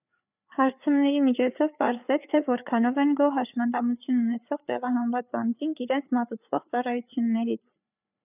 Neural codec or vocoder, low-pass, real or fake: codec, 16 kHz, 4 kbps, FreqCodec, larger model; 3.6 kHz; fake